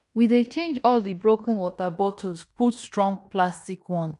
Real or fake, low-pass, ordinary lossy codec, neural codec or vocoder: fake; 10.8 kHz; none; codec, 16 kHz in and 24 kHz out, 0.9 kbps, LongCat-Audio-Codec, fine tuned four codebook decoder